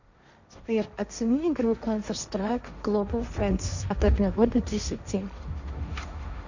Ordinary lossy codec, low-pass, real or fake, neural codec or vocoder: none; 7.2 kHz; fake; codec, 16 kHz, 1.1 kbps, Voila-Tokenizer